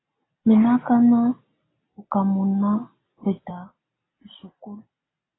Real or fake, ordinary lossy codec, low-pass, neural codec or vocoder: real; AAC, 16 kbps; 7.2 kHz; none